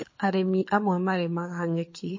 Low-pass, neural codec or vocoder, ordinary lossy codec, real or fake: 7.2 kHz; codec, 24 kHz, 6 kbps, HILCodec; MP3, 32 kbps; fake